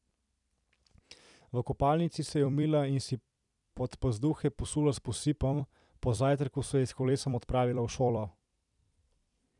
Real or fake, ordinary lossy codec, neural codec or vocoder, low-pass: fake; none; vocoder, 44.1 kHz, 128 mel bands every 256 samples, BigVGAN v2; 10.8 kHz